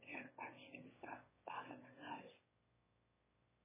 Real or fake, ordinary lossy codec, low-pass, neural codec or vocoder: fake; MP3, 16 kbps; 3.6 kHz; autoencoder, 22.05 kHz, a latent of 192 numbers a frame, VITS, trained on one speaker